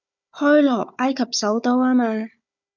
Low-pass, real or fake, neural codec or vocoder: 7.2 kHz; fake; codec, 16 kHz, 4 kbps, FunCodec, trained on Chinese and English, 50 frames a second